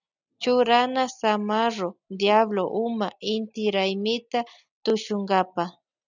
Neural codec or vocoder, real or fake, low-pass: none; real; 7.2 kHz